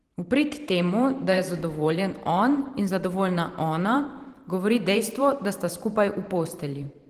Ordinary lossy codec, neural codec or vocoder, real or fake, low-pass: Opus, 16 kbps; vocoder, 44.1 kHz, 128 mel bands every 512 samples, BigVGAN v2; fake; 14.4 kHz